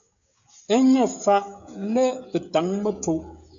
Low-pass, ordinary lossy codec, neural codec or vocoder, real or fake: 7.2 kHz; AAC, 64 kbps; codec, 16 kHz, 16 kbps, FreqCodec, smaller model; fake